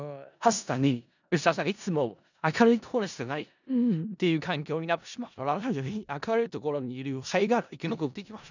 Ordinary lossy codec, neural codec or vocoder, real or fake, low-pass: none; codec, 16 kHz in and 24 kHz out, 0.4 kbps, LongCat-Audio-Codec, four codebook decoder; fake; 7.2 kHz